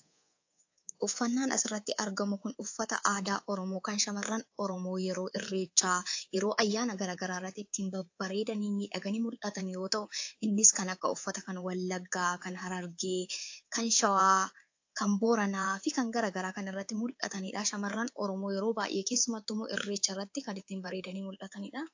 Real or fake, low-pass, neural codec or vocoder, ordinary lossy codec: fake; 7.2 kHz; codec, 24 kHz, 3.1 kbps, DualCodec; AAC, 48 kbps